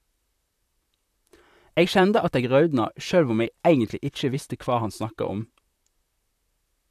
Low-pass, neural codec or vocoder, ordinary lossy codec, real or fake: 14.4 kHz; vocoder, 44.1 kHz, 128 mel bands, Pupu-Vocoder; AAC, 96 kbps; fake